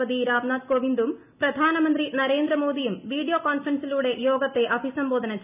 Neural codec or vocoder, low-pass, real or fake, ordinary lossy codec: none; 3.6 kHz; real; none